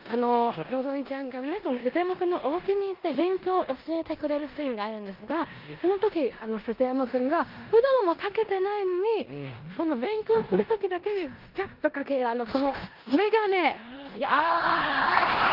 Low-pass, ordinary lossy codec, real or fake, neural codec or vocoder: 5.4 kHz; Opus, 24 kbps; fake; codec, 16 kHz in and 24 kHz out, 0.9 kbps, LongCat-Audio-Codec, four codebook decoder